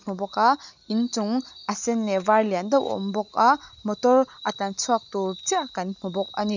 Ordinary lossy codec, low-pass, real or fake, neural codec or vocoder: none; 7.2 kHz; real; none